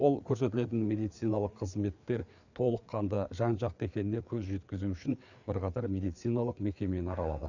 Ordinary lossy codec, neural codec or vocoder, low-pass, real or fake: none; codec, 16 kHz, 4 kbps, FreqCodec, larger model; 7.2 kHz; fake